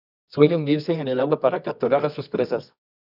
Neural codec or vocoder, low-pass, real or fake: codec, 24 kHz, 0.9 kbps, WavTokenizer, medium music audio release; 5.4 kHz; fake